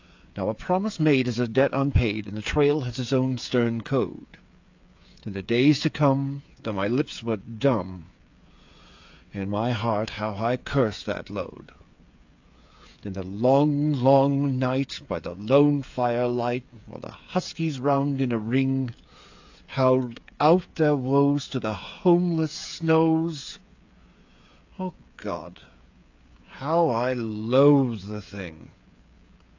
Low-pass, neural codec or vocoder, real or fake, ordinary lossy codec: 7.2 kHz; codec, 16 kHz, 8 kbps, FreqCodec, smaller model; fake; AAC, 48 kbps